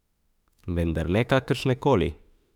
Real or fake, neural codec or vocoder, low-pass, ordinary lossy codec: fake; autoencoder, 48 kHz, 32 numbers a frame, DAC-VAE, trained on Japanese speech; 19.8 kHz; none